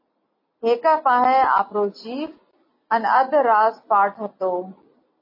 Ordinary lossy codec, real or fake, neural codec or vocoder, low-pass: MP3, 24 kbps; real; none; 5.4 kHz